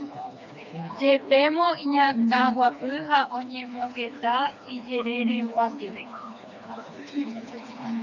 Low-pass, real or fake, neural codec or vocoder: 7.2 kHz; fake; codec, 16 kHz, 2 kbps, FreqCodec, smaller model